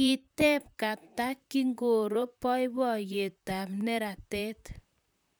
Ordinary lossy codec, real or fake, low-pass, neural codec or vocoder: none; fake; none; vocoder, 44.1 kHz, 128 mel bands, Pupu-Vocoder